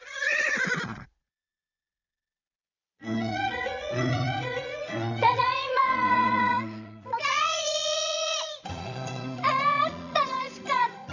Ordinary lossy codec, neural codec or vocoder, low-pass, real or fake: none; vocoder, 22.05 kHz, 80 mel bands, Vocos; 7.2 kHz; fake